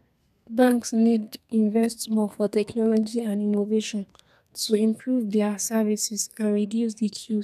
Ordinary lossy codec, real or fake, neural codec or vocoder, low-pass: none; fake; codec, 32 kHz, 1.9 kbps, SNAC; 14.4 kHz